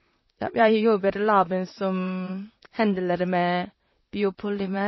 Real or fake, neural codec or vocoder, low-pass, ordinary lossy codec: fake; vocoder, 44.1 kHz, 128 mel bands, Pupu-Vocoder; 7.2 kHz; MP3, 24 kbps